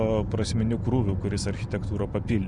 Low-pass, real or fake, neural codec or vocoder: 10.8 kHz; real; none